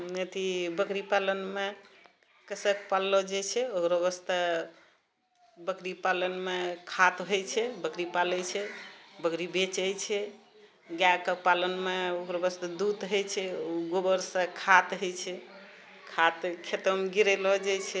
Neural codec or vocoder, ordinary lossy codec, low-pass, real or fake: none; none; none; real